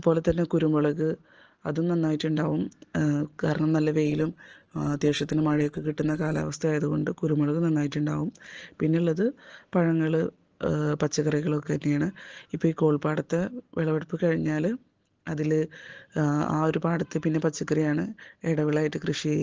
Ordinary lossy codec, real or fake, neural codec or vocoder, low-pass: Opus, 16 kbps; real; none; 7.2 kHz